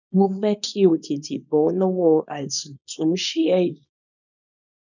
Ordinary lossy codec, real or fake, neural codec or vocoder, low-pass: none; fake; codec, 24 kHz, 0.9 kbps, WavTokenizer, small release; 7.2 kHz